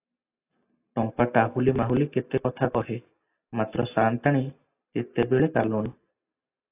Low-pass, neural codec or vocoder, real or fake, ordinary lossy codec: 3.6 kHz; none; real; AAC, 24 kbps